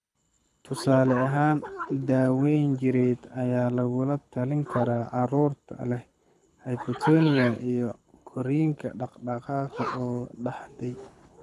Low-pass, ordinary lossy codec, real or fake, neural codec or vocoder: none; none; fake; codec, 24 kHz, 6 kbps, HILCodec